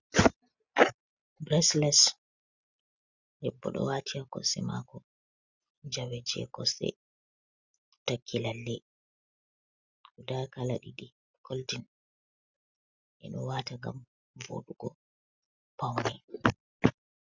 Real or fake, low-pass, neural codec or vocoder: real; 7.2 kHz; none